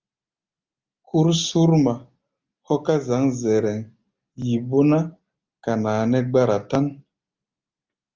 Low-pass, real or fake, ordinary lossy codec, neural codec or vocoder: 7.2 kHz; real; Opus, 32 kbps; none